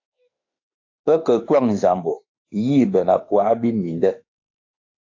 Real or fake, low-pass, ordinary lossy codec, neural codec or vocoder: fake; 7.2 kHz; AAC, 48 kbps; autoencoder, 48 kHz, 32 numbers a frame, DAC-VAE, trained on Japanese speech